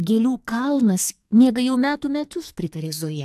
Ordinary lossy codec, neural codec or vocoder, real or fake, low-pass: AAC, 96 kbps; codec, 44.1 kHz, 2.6 kbps, SNAC; fake; 14.4 kHz